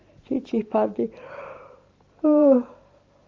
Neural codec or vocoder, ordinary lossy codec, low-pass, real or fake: vocoder, 44.1 kHz, 128 mel bands every 512 samples, BigVGAN v2; Opus, 32 kbps; 7.2 kHz; fake